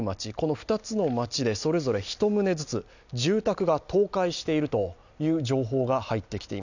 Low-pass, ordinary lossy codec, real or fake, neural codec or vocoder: 7.2 kHz; none; real; none